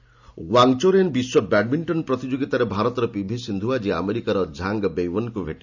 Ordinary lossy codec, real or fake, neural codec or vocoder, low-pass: none; real; none; 7.2 kHz